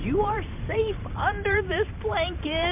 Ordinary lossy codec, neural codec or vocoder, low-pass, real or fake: MP3, 32 kbps; vocoder, 44.1 kHz, 128 mel bands every 512 samples, BigVGAN v2; 3.6 kHz; fake